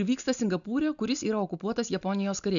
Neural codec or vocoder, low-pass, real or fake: none; 7.2 kHz; real